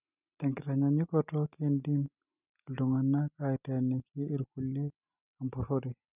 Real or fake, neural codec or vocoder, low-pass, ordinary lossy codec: real; none; 3.6 kHz; none